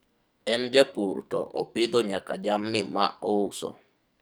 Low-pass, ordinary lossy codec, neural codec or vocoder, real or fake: none; none; codec, 44.1 kHz, 2.6 kbps, SNAC; fake